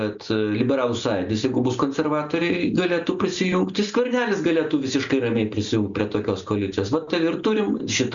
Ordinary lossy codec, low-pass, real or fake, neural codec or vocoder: Opus, 64 kbps; 7.2 kHz; real; none